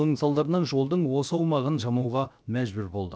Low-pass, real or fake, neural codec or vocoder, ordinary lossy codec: none; fake; codec, 16 kHz, 0.7 kbps, FocalCodec; none